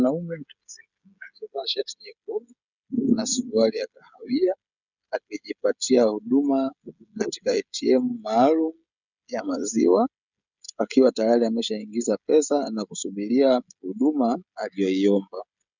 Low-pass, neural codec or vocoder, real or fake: 7.2 kHz; codec, 16 kHz, 16 kbps, FreqCodec, smaller model; fake